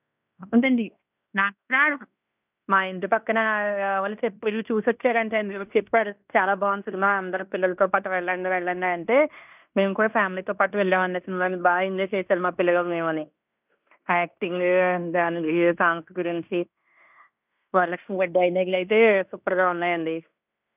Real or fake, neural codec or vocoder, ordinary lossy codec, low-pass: fake; codec, 16 kHz in and 24 kHz out, 0.9 kbps, LongCat-Audio-Codec, fine tuned four codebook decoder; none; 3.6 kHz